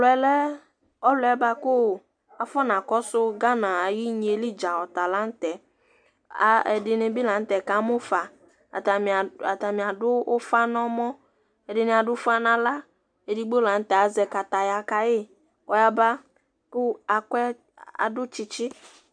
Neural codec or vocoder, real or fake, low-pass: none; real; 9.9 kHz